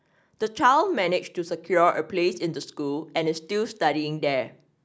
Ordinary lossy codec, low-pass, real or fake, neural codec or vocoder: none; none; real; none